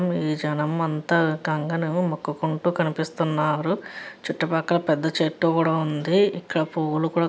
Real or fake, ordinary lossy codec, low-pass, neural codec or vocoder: real; none; none; none